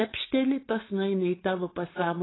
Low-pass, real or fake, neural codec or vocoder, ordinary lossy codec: 7.2 kHz; fake; codec, 16 kHz, 4.8 kbps, FACodec; AAC, 16 kbps